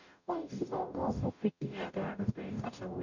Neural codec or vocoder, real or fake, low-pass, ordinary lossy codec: codec, 44.1 kHz, 0.9 kbps, DAC; fake; 7.2 kHz; none